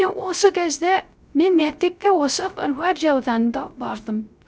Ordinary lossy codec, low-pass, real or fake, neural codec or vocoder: none; none; fake; codec, 16 kHz, 0.3 kbps, FocalCodec